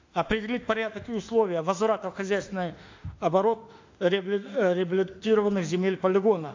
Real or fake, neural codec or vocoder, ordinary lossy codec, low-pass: fake; autoencoder, 48 kHz, 32 numbers a frame, DAC-VAE, trained on Japanese speech; AAC, 48 kbps; 7.2 kHz